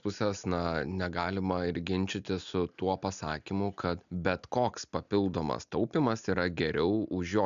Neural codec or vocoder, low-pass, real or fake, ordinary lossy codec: none; 7.2 kHz; real; MP3, 96 kbps